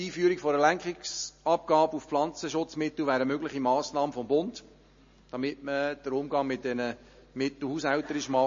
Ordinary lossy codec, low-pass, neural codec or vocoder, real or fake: MP3, 32 kbps; 7.2 kHz; none; real